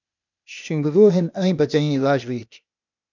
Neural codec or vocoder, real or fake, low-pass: codec, 16 kHz, 0.8 kbps, ZipCodec; fake; 7.2 kHz